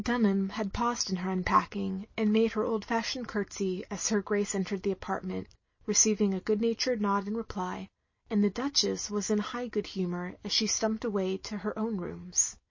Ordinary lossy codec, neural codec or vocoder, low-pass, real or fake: MP3, 32 kbps; none; 7.2 kHz; real